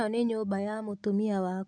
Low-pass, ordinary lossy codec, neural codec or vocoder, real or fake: 9.9 kHz; none; none; real